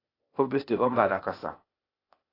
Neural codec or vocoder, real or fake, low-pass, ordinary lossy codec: codec, 16 kHz, 0.8 kbps, ZipCodec; fake; 5.4 kHz; AAC, 24 kbps